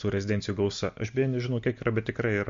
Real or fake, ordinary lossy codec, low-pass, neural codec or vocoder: real; MP3, 48 kbps; 7.2 kHz; none